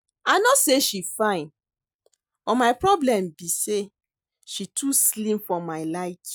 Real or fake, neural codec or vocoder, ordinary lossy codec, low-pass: real; none; none; none